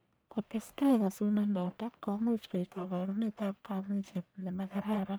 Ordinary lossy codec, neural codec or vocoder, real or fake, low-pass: none; codec, 44.1 kHz, 1.7 kbps, Pupu-Codec; fake; none